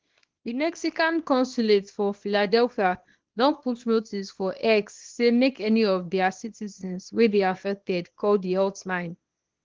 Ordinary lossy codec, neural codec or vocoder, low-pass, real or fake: Opus, 16 kbps; codec, 24 kHz, 0.9 kbps, WavTokenizer, small release; 7.2 kHz; fake